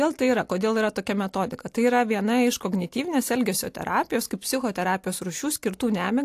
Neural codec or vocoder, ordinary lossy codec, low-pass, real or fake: none; AAC, 64 kbps; 14.4 kHz; real